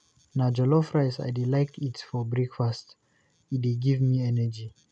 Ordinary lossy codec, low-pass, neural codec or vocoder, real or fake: none; 9.9 kHz; none; real